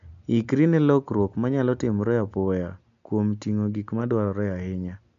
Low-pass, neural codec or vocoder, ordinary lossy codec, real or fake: 7.2 kHz; none; MP3, 64 kbps; real